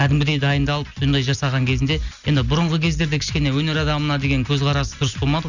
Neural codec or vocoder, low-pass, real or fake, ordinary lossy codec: none; 7.2 kHz; real; none